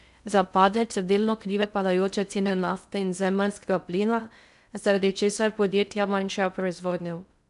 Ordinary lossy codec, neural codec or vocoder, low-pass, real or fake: none; codec, 16 kHz in and 24 kHz out, 0.6 kbps, FocalCodec, streaming, 2048 codes; 10.8 kHz; fake